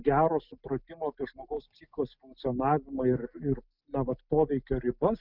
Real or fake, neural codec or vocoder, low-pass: real; none; 5.4 kHz